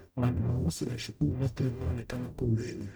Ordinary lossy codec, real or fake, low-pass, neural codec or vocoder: none; fake; none; codec, 44.1 kHz, 0.9 kbps, DAC